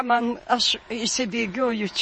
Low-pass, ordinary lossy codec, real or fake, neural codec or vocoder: 10.8 kHz; MP3, 32 kbps; fake; vocoder, 48 kHz, 128 mel bands, Vocos